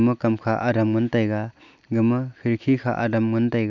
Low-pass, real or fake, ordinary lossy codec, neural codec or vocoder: 7.2 kHz; real; none; none